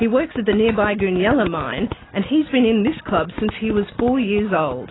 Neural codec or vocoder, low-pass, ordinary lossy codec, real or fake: none; 7.2 kHz; AAC, 16 kbps; real